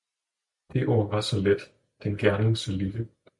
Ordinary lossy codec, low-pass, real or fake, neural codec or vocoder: MP3, 96 kbps; 10.8 kHz; real; none